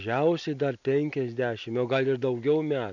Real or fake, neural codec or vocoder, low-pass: real; none; 7.2 kHz